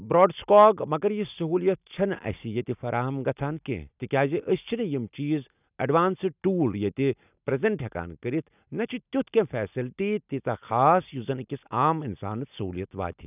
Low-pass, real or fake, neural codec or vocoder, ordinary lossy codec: 3.6 kHz; real; none; none